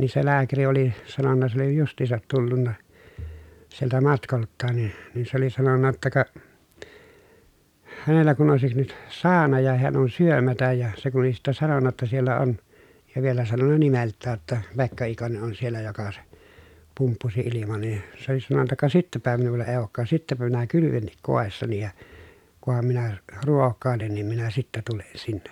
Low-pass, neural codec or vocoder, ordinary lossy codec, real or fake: 19.8 kHz; none; none; real